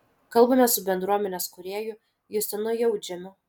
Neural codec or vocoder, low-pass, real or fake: none; 19.8 kHz; real